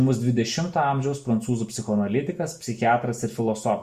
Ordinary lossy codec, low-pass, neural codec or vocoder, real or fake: Opus, 64 kbps; 14.4 kHz; none; real